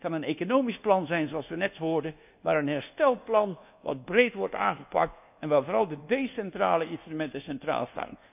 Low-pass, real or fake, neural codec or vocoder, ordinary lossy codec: 3.6 kHz; fake; codec, 24 kHz, 1.2 kbps, DualCodec; none